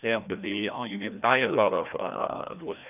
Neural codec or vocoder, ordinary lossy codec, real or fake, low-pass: codec, 16 kHz, 1 kbps, FreqCodec, larger model; none; fake; 3.6 kHz